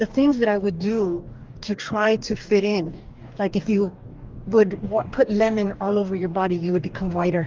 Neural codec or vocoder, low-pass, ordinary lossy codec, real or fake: codec, 44.1 kHz, 2.6 kbps, DAC; 7.2 kHz; Opus, 32 kbps; fake